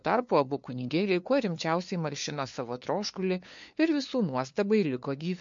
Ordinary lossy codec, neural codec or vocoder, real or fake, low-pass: MP3, 48 kbps; codec, 16 kHz, 2 kbps, FunCodec, trained on LibriTTS, 25 frames a second; fake; 7.2 kHz